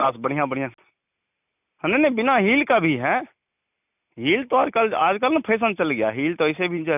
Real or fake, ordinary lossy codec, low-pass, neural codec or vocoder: real; none; 3.6 kHz; none